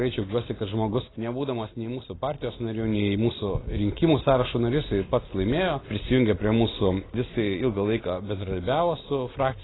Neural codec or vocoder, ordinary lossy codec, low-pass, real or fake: none; AAC, 16 kbps; 7.2 kHz; real